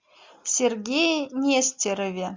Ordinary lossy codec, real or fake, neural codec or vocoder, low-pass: MP3, 64 kbps; real; none; 7.2 kHz